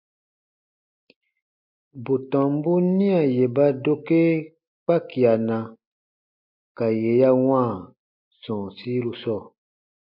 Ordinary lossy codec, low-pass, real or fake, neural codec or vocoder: MP3, 48 kbps; 5.4 kHz; real; none